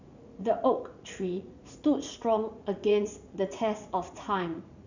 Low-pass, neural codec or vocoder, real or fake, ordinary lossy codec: 7.2 kHz; vocoder, 44.1 kHz, 80 mel bands, Vocos; fake; none